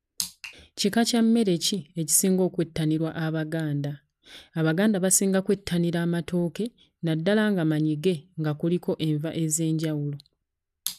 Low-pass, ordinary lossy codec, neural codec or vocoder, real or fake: 14.4 kHz; none; none; real